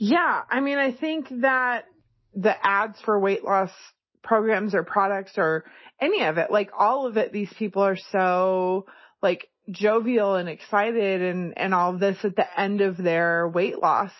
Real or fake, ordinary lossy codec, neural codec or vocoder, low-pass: real; MP3, 24 kbps; none; 7.2 kHz